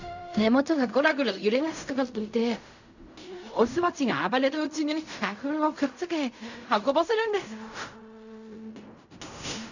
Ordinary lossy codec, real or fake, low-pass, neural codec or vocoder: none; fake; 7.2 kHz; codec, 16 kHz in and 24 kHz out, 0.4 kbps, LongCat-Audio-Codec, fine tuned four codebook decoder